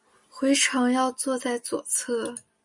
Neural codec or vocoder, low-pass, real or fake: none; 10.8 kHz; real